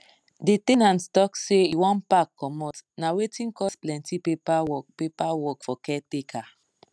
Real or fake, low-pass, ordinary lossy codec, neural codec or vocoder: real; none; none; none